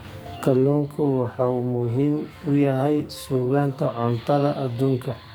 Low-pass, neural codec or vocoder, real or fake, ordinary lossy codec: none; codec, 44.1 kHz, 2.6 kbps, SNAC; fake; none